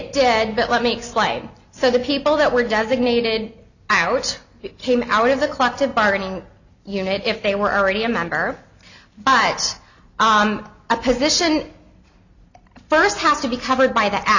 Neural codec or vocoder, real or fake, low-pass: none; real; 7.2 kHz